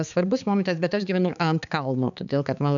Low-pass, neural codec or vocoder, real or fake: 7.2 kHz; codec, 16 kHz, 4 kbps, X-Codec, HuBERT features, trained on balanced general audio; fake